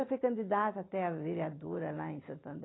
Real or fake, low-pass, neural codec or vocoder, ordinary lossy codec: real; 7.2 kHz; none; AAC, 16 kbps